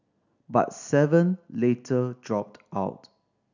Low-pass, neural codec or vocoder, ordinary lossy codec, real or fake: 7.2 kHz; none; none; real